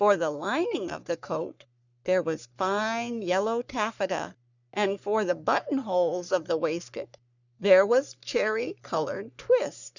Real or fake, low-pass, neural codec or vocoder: fake; 7.2 kHz; codec, 44.1 kHz, 3.4 kbps, Pupu-Codec